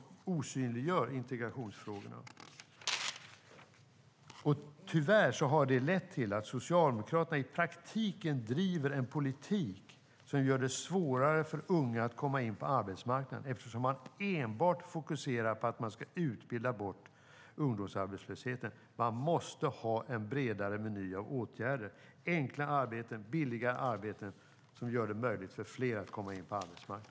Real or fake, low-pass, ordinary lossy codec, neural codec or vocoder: real; none; none; none